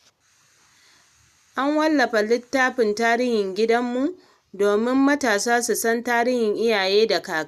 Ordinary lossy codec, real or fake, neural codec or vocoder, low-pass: none; real; none; 14.4 kHz